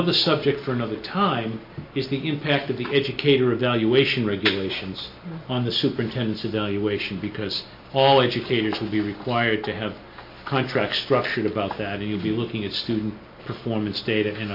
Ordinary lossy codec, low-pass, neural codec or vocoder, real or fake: MP3, 32 kbps; 5.4 kHz; none; real